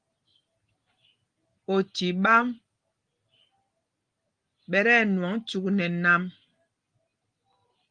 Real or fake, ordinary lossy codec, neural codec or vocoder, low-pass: real; Opus, 24 kbps; none; 9.9 kHz